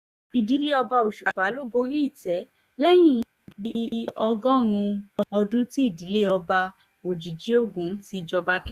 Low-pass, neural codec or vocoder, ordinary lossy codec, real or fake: 14.4 kHz; codec, 32 kHz, 1.9 kbps, SNAC; Opus, 64 kbps; fake